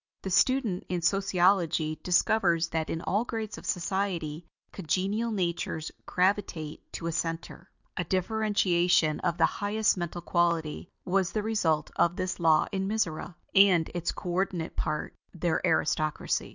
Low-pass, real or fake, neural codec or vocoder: 7.2 kHz; real; none